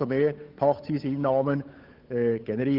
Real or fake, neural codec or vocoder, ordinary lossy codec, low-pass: fake; codec, 16 kHz, 8 kbps, FunCodec, trained on Chinese and English, 25 frames a second; Opus, 24 kbps; 5.4 kHz